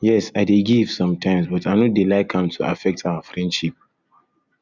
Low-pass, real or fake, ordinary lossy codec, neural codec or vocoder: 7.2 kHz; real; Opus, 64 kbps; none